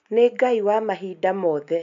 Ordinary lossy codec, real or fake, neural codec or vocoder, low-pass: AAC, 64 kbps; real; none; 7.2 kHz